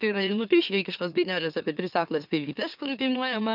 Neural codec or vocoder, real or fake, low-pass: autoencoder, 44.1 kHz, a latent of 192 numbers a frame, MeloTTS; fake; 5.4 kHz